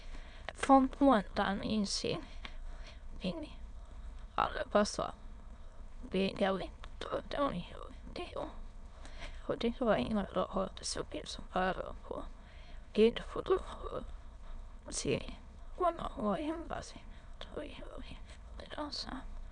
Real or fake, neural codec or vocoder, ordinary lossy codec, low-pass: fake; autoencoder, 22.05 kHz, a latent of 192 numbers a frame, VITS, trained on many speakers; none; 9.9 kHz